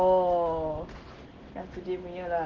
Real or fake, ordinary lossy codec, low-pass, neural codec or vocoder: real; Opus, 16 kbps; 7.2 kHz; none